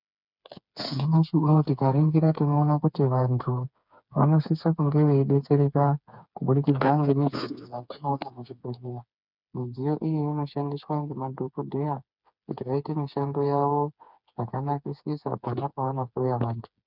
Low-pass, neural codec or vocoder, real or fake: 5.4 kHz; codec, 16 kHz, 4 kbps, FreqCodec, smaller model; fake